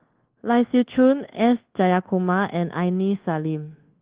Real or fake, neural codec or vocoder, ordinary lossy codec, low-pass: fake; codec, 24 kHz, 1.2 kbps, DualCodec; Opus, 16 kbps; 3.6 kHz